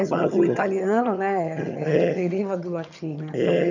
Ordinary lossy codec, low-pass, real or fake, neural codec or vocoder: none; 7.2 kHz; fake; vocoder, 22.05 kHz, 80 mel bands, HiFi-GAN